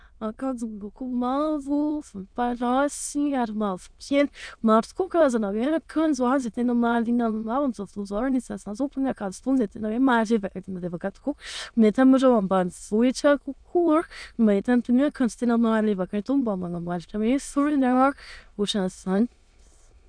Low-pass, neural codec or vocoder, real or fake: 9.9 kHz; autoencoder, 22.05 kHz, a latent of 192 numbers a frame, VITS, trained on many speakers; fake